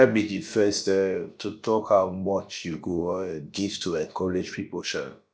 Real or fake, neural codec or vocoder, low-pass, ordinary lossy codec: fake; codec, 16 kHz, about 1 kbps, DyCAST, with the encoder's durations; none; none